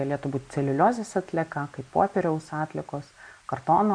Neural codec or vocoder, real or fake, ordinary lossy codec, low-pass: none; real; MP3, 48 kbps; 9.9 kHz